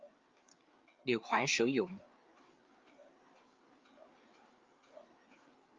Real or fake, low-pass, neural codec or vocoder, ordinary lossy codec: fake; 7.2 kHz; codec, 16 kHz, 4 kbps, FreqCodec, larger model; Opus, 32 kbps